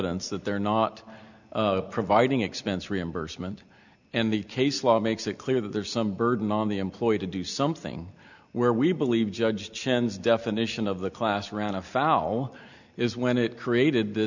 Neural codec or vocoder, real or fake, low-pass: none; real; 7.2 kHz